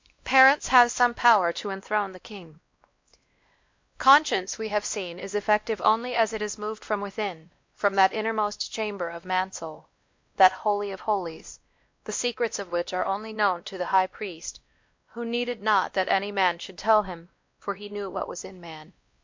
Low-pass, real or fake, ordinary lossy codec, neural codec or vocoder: 7.2 kHz; fake; MP3, 48 kbps; codec, 16 kHz, 1 kbps, X-Codec, WavLM features, trained on Multilingual LibriSpeech